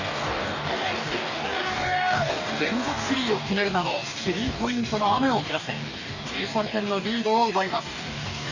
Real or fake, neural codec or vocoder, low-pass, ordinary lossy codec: fake; codec, 44.1 kHz, 2.6 kbps, DAC; 7.2 kHz; none